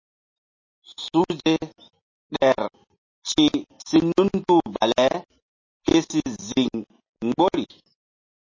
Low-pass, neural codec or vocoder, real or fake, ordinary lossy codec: 7.2 kHz; none; real; MP3, 32 kbps